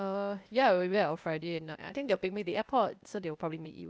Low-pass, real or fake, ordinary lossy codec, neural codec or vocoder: none; fake; none; codec, 16 kHz, 0.8 kbps, ZipCodec